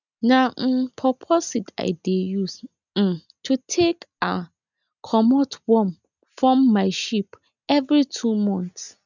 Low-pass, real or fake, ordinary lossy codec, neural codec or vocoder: 7.2 kHz; real; none; none